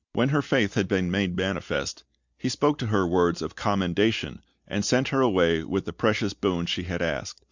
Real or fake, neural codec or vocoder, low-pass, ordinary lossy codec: real; none; 7.2 kHz; Opus, 64 kbps